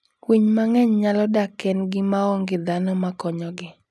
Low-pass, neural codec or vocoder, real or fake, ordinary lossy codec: none; none; real; none